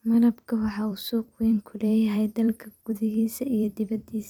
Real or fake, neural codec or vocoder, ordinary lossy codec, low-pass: fake; vocoder, 44.1 kHz, 128 mel bands every 256 samples, BigVGAN v2; none; 19.8 kHz